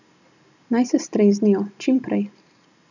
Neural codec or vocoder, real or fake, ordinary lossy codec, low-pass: none; real; none; 7.2 kHz